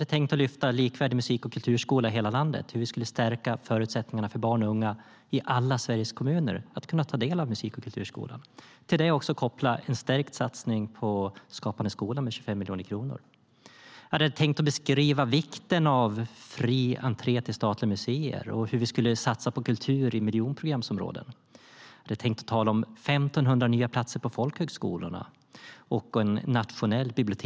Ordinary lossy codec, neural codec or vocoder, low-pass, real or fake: none; none; none; real